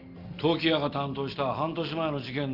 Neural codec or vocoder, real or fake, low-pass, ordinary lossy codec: none; real; 5.4 kHz; Opus, 32 kbps